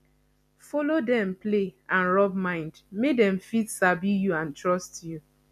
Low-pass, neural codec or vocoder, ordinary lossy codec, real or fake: 14.4 kHz; none; none; real